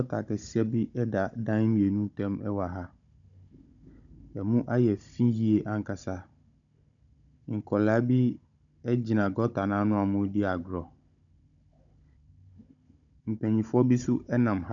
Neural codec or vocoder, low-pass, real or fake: codec, 16 kHz, 16 kbps, FunCodec, trained on Chinese and English, 50 frames a second; 7.2 kHz; fake